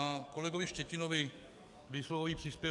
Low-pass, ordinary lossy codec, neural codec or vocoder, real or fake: 10.8 kHz; AAC, 64 kbps; codec, 44.1 kHz, 7.8 kbps, DAC; fake